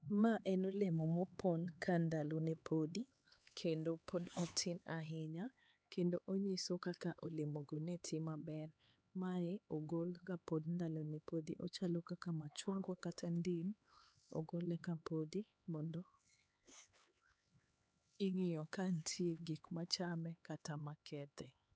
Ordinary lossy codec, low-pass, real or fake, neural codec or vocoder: none; none; fake; codec, 16 kHz, 4 kbps, X-Codec, HuBERT features, trained on LibriSpeech